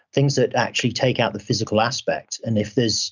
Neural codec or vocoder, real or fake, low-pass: none; real; 7.2 kHz